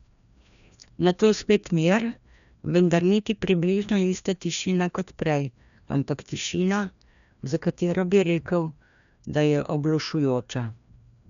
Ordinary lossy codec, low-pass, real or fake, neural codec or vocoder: none; 7.2 kHz; fake; codec, 16 kHz, 1 kbps, FreqCodec, larger model